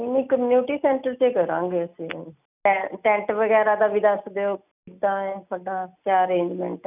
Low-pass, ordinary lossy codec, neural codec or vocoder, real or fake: 3.6 kHz; none; none; real